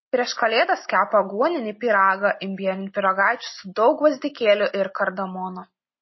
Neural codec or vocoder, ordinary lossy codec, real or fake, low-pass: none; MP3, 24 kbps; real; 7.2 kHz